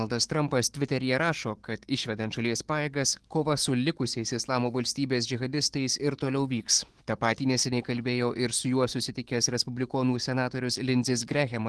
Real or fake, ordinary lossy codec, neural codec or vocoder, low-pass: fake; Opus, 16 kbps; autoencoder, 48 kHz, 128 numbers a frame, DAC-VAE, trained on Japanese speech; 10.8 kHz